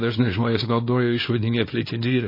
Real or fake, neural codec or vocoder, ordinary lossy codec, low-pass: fake; codec, 24 kHz, 0.9 kbps, WavTokenizer, small release; MP3, 24 kbps; 5.4 kHz